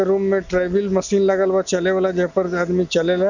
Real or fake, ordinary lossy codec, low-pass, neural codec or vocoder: real; none; 7.2 kHz; none